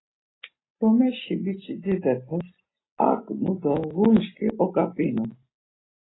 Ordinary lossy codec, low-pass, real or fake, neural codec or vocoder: AAC, 16 kbps; 7.2 kHz; real; none